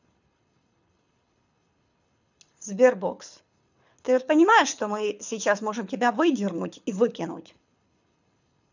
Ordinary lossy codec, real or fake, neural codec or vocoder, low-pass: none; fake; codec, 24 kHz, 6 kbps, HILCodec; 7.2 kHz